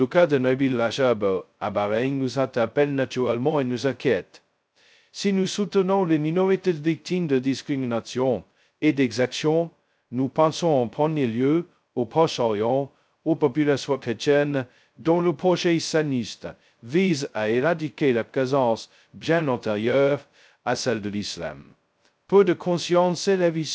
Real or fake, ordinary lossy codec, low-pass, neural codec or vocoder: fake; none; none; codec, 16 kHz, 0.2 kbps, FocalCodec